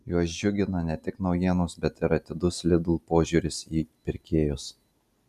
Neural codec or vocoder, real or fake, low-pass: none; real; 14.4 kHz